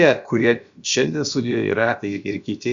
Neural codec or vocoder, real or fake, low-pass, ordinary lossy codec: codec, 16 kHz, about 1 kbps, DyCAST, with the encoder's durations; fake; 7.2 kHz; Opus, 64 kbps